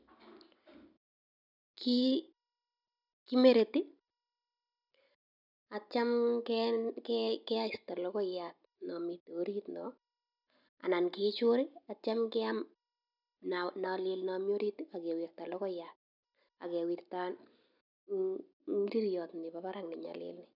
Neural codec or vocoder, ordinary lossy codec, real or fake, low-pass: none; none; real; 5.4 kHz